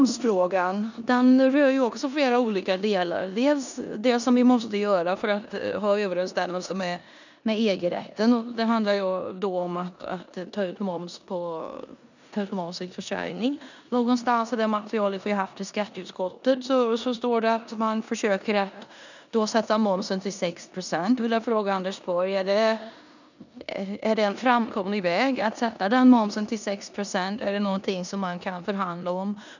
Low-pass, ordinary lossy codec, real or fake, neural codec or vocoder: 7.2 kHz; none; fake; codec, 16 kHz in and 24 kHz out, 0.9 kbps, LongCat-Audio-Codec, four codebook decoder